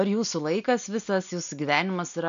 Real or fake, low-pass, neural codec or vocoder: real; 7.2 kHz; none